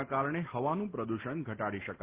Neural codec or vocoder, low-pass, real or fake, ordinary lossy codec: none; 3.6 kHz; real; Opus, 16 kbps